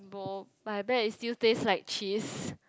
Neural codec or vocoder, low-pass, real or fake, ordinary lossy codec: none; none; real; none